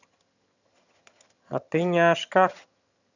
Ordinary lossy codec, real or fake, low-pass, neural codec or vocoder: none; fake; 7.2 kHz; vocoder, 22.05 kHz, 80 mel bands, HiFi-GAN